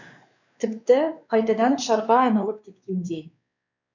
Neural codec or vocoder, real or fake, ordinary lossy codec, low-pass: codec, 16 kHz, 2 kbps, X-Codec, WavLM features, trained on Multilingual LibriSpeech; fake; none; 7.2 kHz